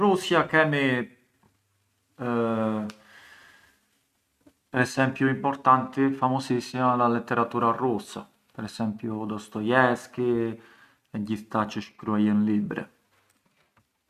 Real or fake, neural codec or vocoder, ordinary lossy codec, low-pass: real; none; none; 14.4 kHz